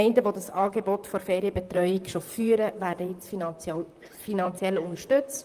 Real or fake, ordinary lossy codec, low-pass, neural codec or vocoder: fake; Opus, 32 kbps; 14.4 kHz; vocoder, 44.1 kHz, 128 mel bands, Pupu-Vocoder